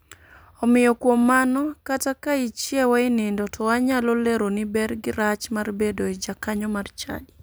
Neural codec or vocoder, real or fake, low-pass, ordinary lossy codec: vocoder, 44.1 kHz, 128 mel bands every 256 samples, BigVGAN v2; fake; none; none